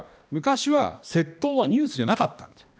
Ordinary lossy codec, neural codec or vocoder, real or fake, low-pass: none; codec, 16 kHz, 1 kbps, X-Codec, HuBERT features, trained on balanced general audio; fake; none